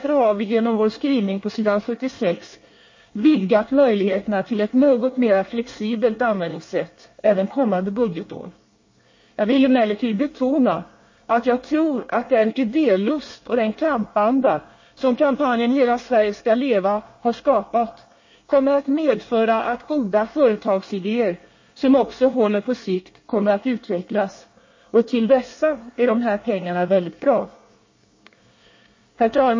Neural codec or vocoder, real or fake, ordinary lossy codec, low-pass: codec, 24 kHz, 1 kbps, SNAC; fake; MP3, 32 kbps; 7.2 kHz